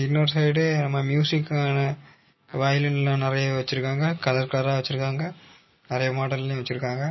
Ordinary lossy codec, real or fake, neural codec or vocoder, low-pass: MP3, 24 kbps; real; none; 7.2 kHz